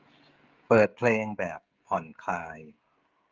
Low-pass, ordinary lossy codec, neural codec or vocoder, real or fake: 7.2 kHz; Opus, 32 kbps; codec, 16 kHz, 16 kbps, FreqCodec, smaller model; fake